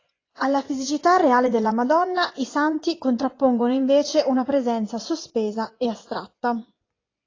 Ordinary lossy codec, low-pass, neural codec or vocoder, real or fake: AAC, 32 kbps; 7.2 kHz; vocoder, 24 kHz, 100 mel bands, Vocos; fake